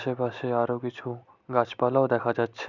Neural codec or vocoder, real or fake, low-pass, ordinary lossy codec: none; real; 7.2 kHz; none